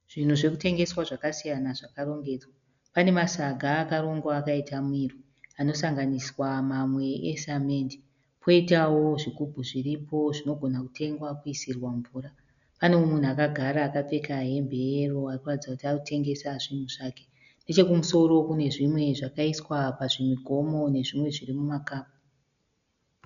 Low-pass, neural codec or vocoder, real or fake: 7.2 kHz; none; real